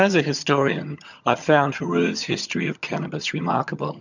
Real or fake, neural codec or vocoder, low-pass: fake; vocoder, 22.05 kHz, 80 mel bands, HiFi-GAN; 7.2 kHz